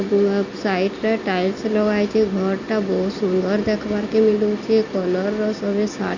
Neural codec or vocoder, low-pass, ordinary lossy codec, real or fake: none; 7.2 kHz; Opus, 64 kbps; real